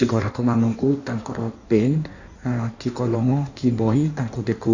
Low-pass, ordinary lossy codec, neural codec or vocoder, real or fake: 7.2 kHz; none; codec, 16 kHz in and 24 kHz out, 1.1 kbps, FireRedTTS-2 codec; fake